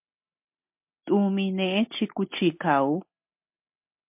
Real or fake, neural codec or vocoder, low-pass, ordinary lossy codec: real; none; 3.6 kHz; MP3, 32 kbps